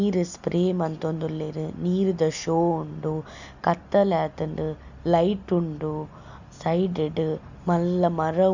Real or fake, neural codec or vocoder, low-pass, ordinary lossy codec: real; none; 7.2 kHz; none